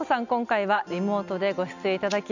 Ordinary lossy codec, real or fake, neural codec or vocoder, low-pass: none; real; none; 7.2 kHz